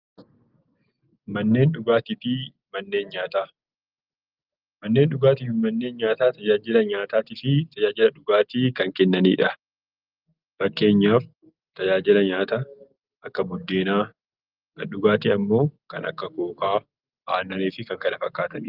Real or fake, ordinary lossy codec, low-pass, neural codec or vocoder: real; Opus, 32 kbps; 5.4 kHz; none